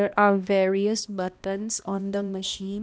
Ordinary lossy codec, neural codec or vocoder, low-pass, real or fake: none; codec, 16 kHz, 1 kbps, X-Codec, HuBERT features, trained on balanced general audio; none; fake